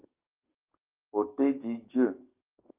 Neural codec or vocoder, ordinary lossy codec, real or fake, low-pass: codec, 44.1 kHz, 7.8 kbps, DAC; Opus, 32 kbps; fake; 3.6 kHz